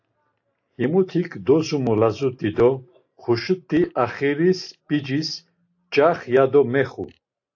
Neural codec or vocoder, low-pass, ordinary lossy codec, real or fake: none; 7.2 kHz; AAC, 48 kbps; real